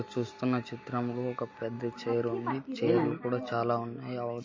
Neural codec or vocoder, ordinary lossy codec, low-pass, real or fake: none; MP3, 32 kbps; 7.2 kHz; real